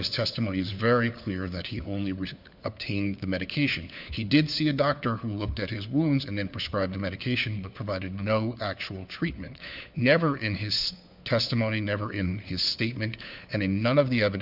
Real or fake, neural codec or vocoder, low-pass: fake; codec, 16 kHz, 4 kbps, FunCodec, trained on LibriTTS, 50 frames a second; 5.4 kHz